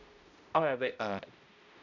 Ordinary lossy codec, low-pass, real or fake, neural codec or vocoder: none; 7.2 kHz; fake; codec, 16 kHz, 0.5 kbps, X-Codec, HuBERT features, trained on balanced general audio